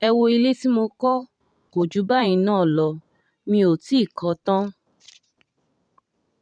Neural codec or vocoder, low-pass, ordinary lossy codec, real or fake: vocoder, 44.1 kHz, 128 mel bands every 512 samples, BigVGAN v2; 9.9 kHz; none; fake